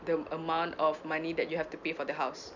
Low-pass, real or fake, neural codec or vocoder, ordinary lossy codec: 7.2 kHz; real; none; none